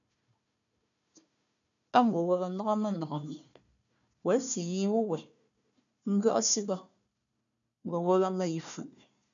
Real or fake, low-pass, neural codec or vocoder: fake; 7.2 kHz; codec, 16 kHz, 1 kbps, FunCodec, trained on Chinese and English, 50 frames a second